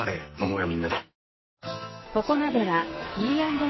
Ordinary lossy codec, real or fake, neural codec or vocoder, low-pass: MP3, 24 kbps; fake; codec, 44.1 kHz, 2.6 kbps, SNAC; 7.2 kHz